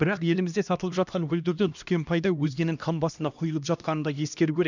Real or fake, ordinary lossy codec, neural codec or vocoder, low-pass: fake; none; codec, 16 kHz, 1 kbps, X-Codec, HuBERT features, trained on LibriSpeech; 7.2 kHz